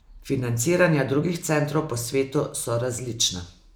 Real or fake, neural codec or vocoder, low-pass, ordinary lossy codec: real; none; none; none